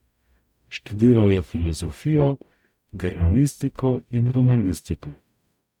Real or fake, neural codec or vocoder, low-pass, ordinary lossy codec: fake; codec, 44.1 kHz, 0.9 kbps, DAC; 19.8 kHz; none